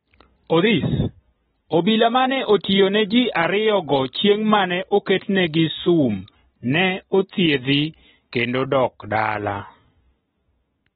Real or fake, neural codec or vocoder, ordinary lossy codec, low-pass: real; none; AAC, 16 kbps; 19.8 kHz